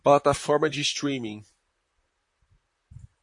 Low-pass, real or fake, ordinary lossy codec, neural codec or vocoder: 10.8 kHz; fake; MP3, 48 kbps; vocoder, 44.1 kHz, 128 mel bands, Pupu-Vocoder